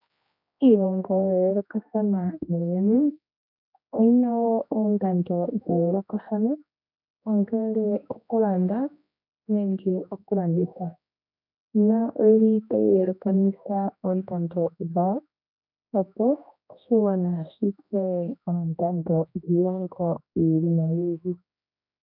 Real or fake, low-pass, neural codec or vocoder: fake; 5.4 kHz; codec, 16 kHz, 1 kbps, X-Codec, HuBERT features, trained on general audio